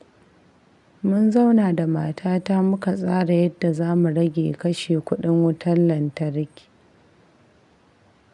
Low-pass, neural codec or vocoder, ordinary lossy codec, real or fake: 10.8 kHz; none; none; real